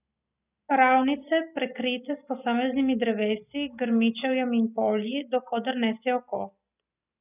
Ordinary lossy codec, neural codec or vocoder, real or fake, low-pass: none; none; real; 3.6 kHz